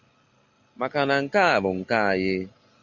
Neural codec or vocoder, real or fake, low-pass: none; real; 7.2 kHz